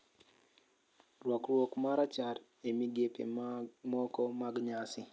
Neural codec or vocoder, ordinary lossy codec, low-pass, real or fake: none; none; none; real